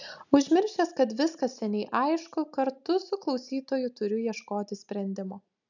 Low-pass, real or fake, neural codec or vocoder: 7.2 kHz; real; none